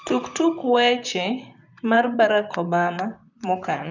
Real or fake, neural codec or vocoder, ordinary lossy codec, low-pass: fake; vocoder, 44.1 kHz, 128 mel bands, Pupu-Vocoder; none; 7.2 kHz